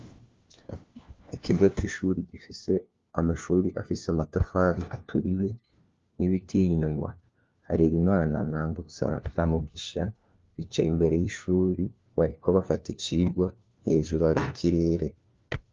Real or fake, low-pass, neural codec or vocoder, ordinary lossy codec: fake; 7.2 kHz; codec, 16 kHz, 1 kbps, FunCodec, trained on LibriTTS, 50 frames a second; Opus, 32 kbps